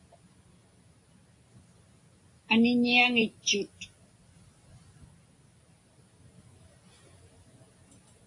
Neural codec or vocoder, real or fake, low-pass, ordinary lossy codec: none; real; 10.8 kHz; AAC, 48 kbps